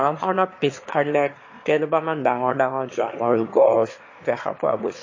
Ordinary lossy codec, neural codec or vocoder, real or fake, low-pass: MP3, 32 kbps; autoencoder, 22.05 kHz, a latent of 192 numbers a frame, VITS, trained on one speaker; fake; 7.2 kHz